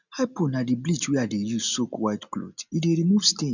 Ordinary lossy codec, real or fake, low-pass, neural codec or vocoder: none; real; 7.2 kHz; none